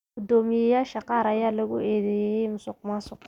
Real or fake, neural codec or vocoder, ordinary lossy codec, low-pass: real; none; none; 19.8 kHz